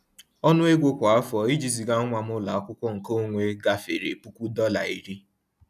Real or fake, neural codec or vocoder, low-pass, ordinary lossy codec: real; none; 14.4 kHz; none